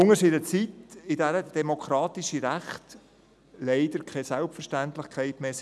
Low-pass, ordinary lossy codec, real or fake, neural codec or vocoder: none; none; real; none